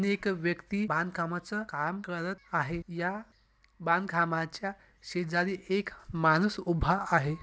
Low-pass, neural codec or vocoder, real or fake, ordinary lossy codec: none; none; real; none